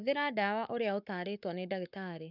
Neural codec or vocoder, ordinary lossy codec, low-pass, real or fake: codec, 44.1 kHz, 7.8 kbps, Pupu-Codec; none; 5.4 kHz; fake